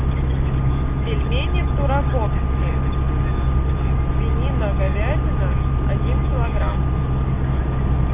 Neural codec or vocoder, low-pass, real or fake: none; 3.6 kHz; real